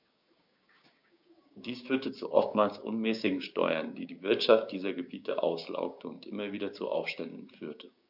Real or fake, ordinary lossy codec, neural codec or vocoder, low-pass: fake; none; codec, 16 kHz, 6 kbps, DAC; 5.4 kHz